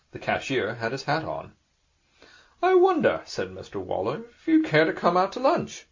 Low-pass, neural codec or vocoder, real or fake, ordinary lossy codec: 7.2 kHz; none; real; MP3, 48 kbps